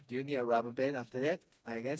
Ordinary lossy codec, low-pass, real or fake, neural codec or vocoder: none; none; fake; codec, 16 kHz, 2 kbps, FreqCodec, smaller model